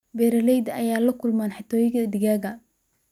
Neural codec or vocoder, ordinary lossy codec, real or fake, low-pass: none; none; real; 19.8 kHz